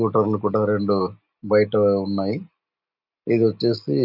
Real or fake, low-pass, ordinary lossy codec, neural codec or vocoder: real; 5.4 kHz; none; none